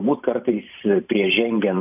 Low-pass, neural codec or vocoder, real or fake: 3.6 kHz; vocoder, 44.1 kHz, 128 mel bands every 512 samples, BigVGAN v2; fake